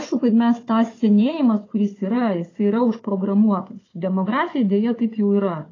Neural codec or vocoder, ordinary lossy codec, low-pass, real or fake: codec, 16 kHz, 4 kbps, FunCodec, trained on Chinese and English, 50 frames a second; AAC, 32 kbps; 7.2 kHz; fake